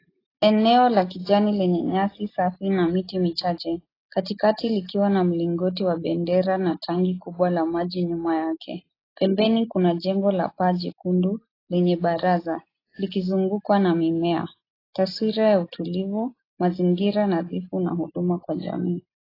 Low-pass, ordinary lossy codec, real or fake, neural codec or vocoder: 5.4 kHz; AAC, 24 kbps; real; none